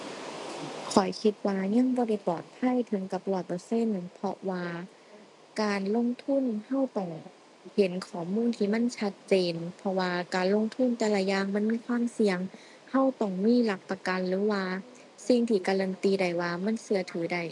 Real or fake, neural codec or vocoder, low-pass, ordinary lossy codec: real; none; 10.8 kHz; MP3, 64 kbps